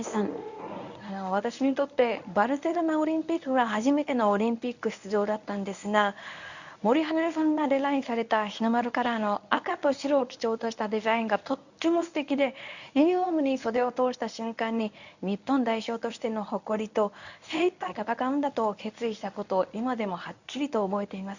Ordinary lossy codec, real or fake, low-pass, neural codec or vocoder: none; fake; 7.2 kHz; codec, 24 kHz, 0.9 kbps, WavTokenizer, medium speech release version 1